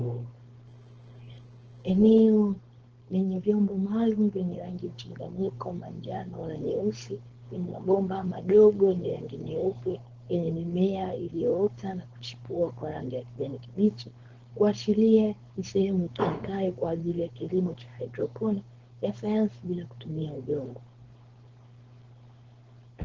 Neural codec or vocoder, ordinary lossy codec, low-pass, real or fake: codec, 16 kHz, 4.8 kbps, FACodec; Opus, 16 kbps; 7.2 kHz; fake